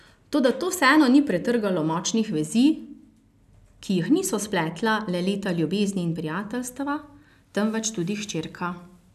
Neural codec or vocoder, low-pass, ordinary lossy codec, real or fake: none; 14.4 kHz; none; real